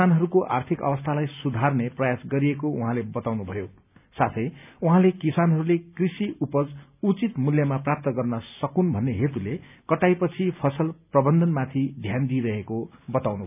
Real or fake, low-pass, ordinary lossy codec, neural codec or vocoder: real; 3.6 kHz; none; none